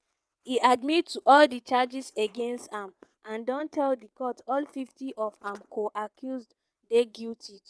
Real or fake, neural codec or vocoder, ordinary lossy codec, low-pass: fake; vocoder, 22.05 kHz, 80 mel bands, WaveNeXt; none; none